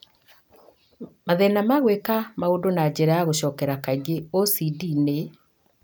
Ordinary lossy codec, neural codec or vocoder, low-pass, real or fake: none; none; none; real